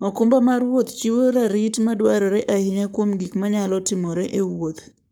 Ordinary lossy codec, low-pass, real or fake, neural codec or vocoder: none; none; fake; codec, 44.1 kHz, 7.8 kbps, Pupu-Codec